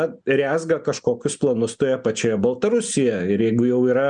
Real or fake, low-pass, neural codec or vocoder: real; 9.9 kHz; none